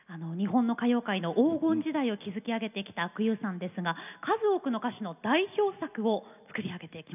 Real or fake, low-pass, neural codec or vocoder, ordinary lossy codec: real; 3.6 kHz; none; none